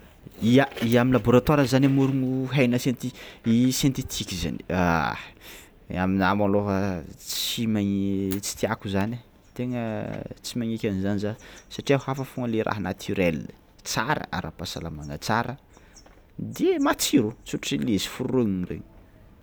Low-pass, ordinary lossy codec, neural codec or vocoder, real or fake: none; none; none; real